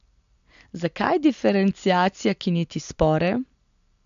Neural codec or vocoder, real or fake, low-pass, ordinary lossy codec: none; real; 7.2 kHz; MP3, 64 kbps